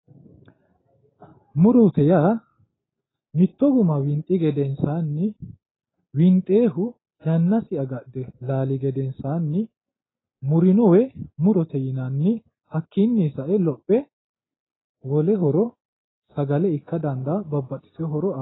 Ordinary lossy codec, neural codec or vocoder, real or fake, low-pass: AAC, 16 kbps; none; real; 7.2 kHz